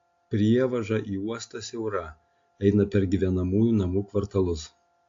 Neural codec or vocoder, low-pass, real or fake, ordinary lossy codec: none; 7.2 kHz; real; AAC, 48 kbps